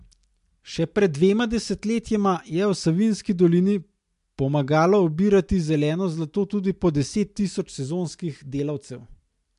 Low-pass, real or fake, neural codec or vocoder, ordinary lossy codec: 10.8 kHz; real; none; MP3, 64 kbps